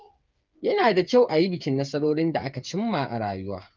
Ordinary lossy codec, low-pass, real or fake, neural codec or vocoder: Opus, 24 kbps; 7.2 kHz; fake; codec, 16 kHz, 8 kbps, FreqCodec, smaller model